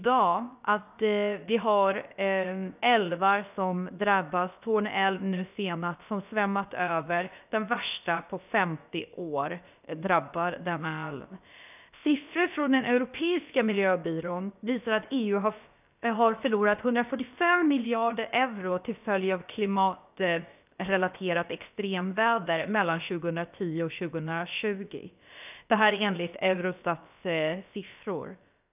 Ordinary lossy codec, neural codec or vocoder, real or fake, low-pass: none; codec, 16 kHz, about 1 kbps, DyCAST, with the encoder's durations; fake; 3.6 kHz